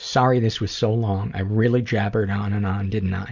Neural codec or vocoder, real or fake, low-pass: none; real; 7.2 kHz